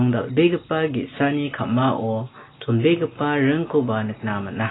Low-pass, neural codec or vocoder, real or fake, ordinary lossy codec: 7.2 kHz; none; real; AAC, 16 kbps